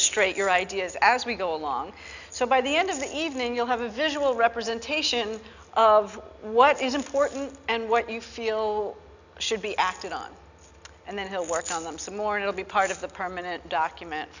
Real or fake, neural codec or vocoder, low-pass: real; none; 7.2 kHz